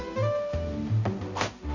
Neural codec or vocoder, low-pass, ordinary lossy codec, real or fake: codec, 16 kHz, 0.5 kbps, X-Codec, HuBERT features, trained on balanced general audio; 7.2 kHz; none; fake